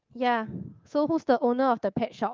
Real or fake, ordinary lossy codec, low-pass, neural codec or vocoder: fake; Opus, 32 kbps; 7.2 kHz; codec, 24 kHz, 3.1 kbps, DualCodec